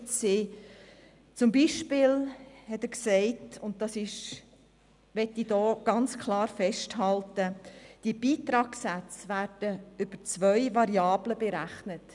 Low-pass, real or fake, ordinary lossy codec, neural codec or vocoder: 10.8 kHz; real; none; none